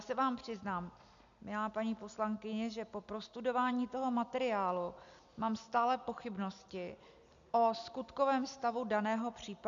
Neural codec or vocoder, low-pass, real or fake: none; 7.2 kHz; real